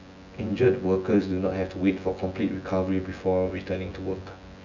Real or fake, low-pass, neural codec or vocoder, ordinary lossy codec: fake; 7.2 kHz; vocoder, 24 kHz, 100 mel bands, Vocos; none